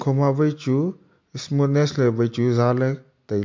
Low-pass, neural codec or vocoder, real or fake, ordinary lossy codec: 7.2 kHz; none; real; MP3, 48 kbps